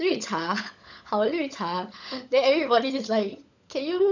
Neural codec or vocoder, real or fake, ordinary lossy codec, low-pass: codec, 16 kHz, 16 kbps, FunCodec, trained on LibriTTS, 50 frames a second; fake; none; 7.2 kHz